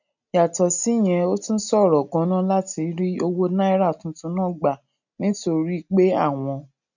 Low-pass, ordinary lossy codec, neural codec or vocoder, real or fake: 7.2 kHz; none; none; real